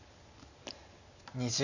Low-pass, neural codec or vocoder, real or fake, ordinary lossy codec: 7.2 kHz; none; real; none